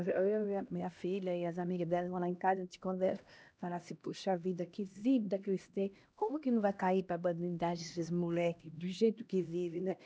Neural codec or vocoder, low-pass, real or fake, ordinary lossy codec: codec, 16 kHz, 1 kbps, X-Codec, HuBERT features, trained on LibriSpeech; none; fake; none